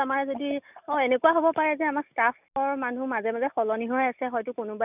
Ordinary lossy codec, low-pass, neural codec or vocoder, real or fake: none; 3.6 kHz; none; real